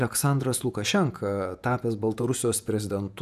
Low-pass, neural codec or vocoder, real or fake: 14.4 kHz; vocoder, 48 kHz, 128 mel bands, Vocos; fake